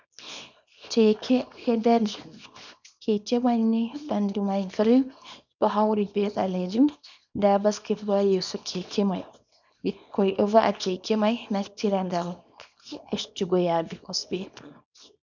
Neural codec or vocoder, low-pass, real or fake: codec, 24 kHz, 0.9 kbps, WavTokenizer, small release; 7.2 kHz; fake